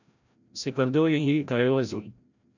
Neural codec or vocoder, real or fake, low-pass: codec, 16 kHz, 0.5 kbps, FreqCodec, larger model; fake; 7.2 kHz